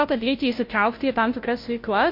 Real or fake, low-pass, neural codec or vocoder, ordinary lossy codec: fake; 5.4 kHz; codec, 16 kHz, 0.5 kbps, FunCodec, trained on Chinese and English, 25 frames a second; MP3, 32 kbps